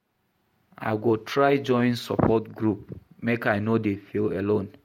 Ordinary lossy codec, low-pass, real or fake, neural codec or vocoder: MP3, 64 kbps; 19.8 kHz; fake; vocoder, 48 kHz, 128 mel bands, Vocos